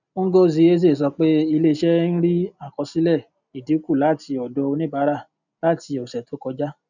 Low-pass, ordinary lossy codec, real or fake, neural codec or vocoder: 7.2 kHz; none; real; none